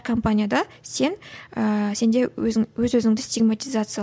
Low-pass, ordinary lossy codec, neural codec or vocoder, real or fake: none; none; none; real